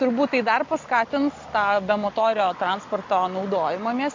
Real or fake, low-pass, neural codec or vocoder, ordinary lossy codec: real; 7.2 kHz; none; MP3, 48 kbps